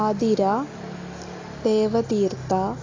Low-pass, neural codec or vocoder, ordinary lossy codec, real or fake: 7.2 kHz; none; AAC, 32 kbps; real